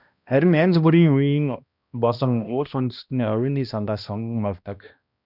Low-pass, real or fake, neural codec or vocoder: 5.4 kHz; fake; codec, 16 kHz, 1 kbps, X-Codec, HuBERT features, trained on balanced general audio